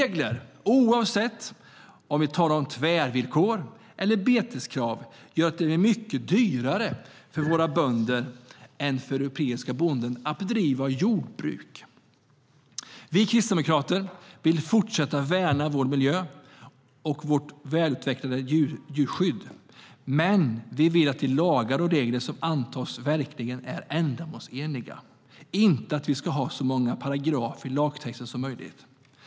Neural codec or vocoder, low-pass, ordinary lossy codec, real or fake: none; none; none; real